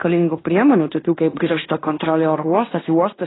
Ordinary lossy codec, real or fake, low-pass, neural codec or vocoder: AAC, 16 kbps; fake; 7.2 kHz; codec, 16 kHz in and 24 kHz out, 0.9 kbps, LongCat-Audio-Codec, fine tuned four codebook decoder